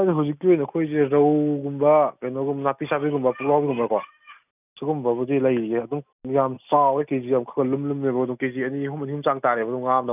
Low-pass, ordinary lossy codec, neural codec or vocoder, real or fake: 3.6 kHz; none; none; real